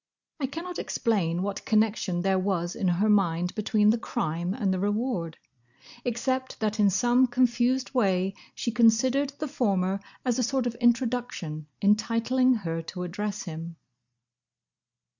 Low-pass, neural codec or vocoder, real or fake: 7.2 kHz; none; real